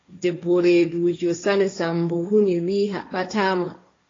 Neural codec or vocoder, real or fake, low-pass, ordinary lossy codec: codec, 16 kHz, 1.1 kbps, Voila-Tokenizer; fake; 7.2 kHz; AAC, 32 kbps